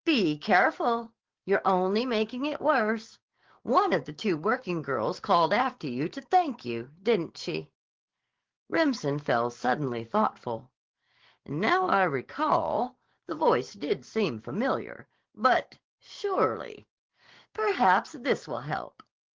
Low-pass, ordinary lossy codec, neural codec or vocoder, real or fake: 7.2 kHz; Opus, 16 kbps; codec, 44.1 kHz, 7.8 kbps, DAC; fake